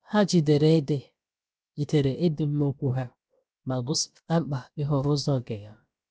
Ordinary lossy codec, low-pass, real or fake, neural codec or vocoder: none; none; fake; codec, 16 kHz, about 1 kbps, DyCAST, with the encoder's durations